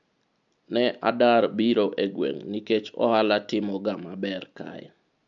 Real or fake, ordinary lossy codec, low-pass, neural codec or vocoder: real; MP3, 64 kbps; 7.2 kHz; none